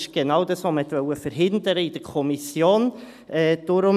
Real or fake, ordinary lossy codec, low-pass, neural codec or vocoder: real; none; 14.4 kHz; none